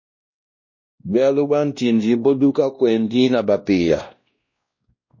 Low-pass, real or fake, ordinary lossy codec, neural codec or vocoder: 7.2 kHz; fake; MP3, 32 kbps; codec, 16 kHz, 1 kbps, X-Codec, WavLM features, trained on Multilingual LibriSpeech